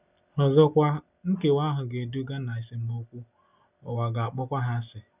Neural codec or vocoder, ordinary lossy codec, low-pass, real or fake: none; none; 3.6 kHz; real